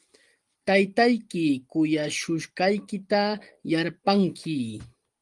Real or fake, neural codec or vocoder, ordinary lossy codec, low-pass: real; none; Opus, 24 kbps; 10.8 kHz